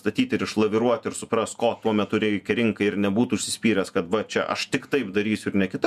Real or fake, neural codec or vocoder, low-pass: fake; vocoder, 48 kHz, 128 mel bands, Vocos; 14.4 kHz